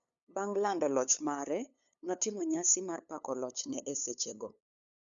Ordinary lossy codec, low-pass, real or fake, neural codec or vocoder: none; 7.2 kHz; fake; codec, 16 kHz, 8 kbps, FunCodec, trained on LibriTTS, 25 frames a second